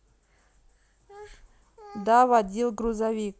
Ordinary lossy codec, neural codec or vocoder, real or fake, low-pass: none; none; real; none